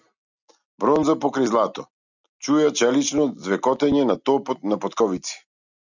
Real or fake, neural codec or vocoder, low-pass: real; none; 7.2 kHz